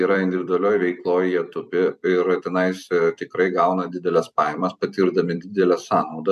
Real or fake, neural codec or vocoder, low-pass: fake; vocoder, 44.1 kHz, 128 mel bands every 256 samples, BigVGAN v2; 14.4 kHz